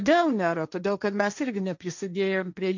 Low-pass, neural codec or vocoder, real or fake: 7.2 kHz; codec, 16 kHz, 1.1 kbps, Voila-Tokenizer; fake